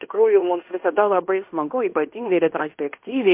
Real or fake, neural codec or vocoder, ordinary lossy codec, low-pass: fake; codec, 16 kHz in and 24 kHz out, 0.9 kbps, LongCat-Audio-Codec, fine tuned four codebook decoder; MP3, 32 kbps; 3.6 kHz